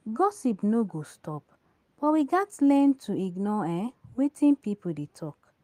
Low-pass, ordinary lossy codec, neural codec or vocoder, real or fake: 14.4 kHz; Opus, 32 kbps; none; real